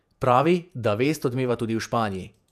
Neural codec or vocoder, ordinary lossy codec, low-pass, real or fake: vocoder, 48 kHz, 128 mel bands, Vocos; none; 14.4 kHz; fake